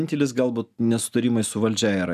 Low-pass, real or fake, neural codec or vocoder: 14.4 kHz; real; none